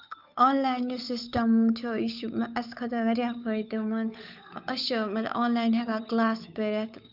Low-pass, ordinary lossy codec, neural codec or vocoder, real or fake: 5.4 kHz; none; codec, 16 kHz, 8 kbps, FunCodec, trained on Chinese and English, 25 frames a second; fake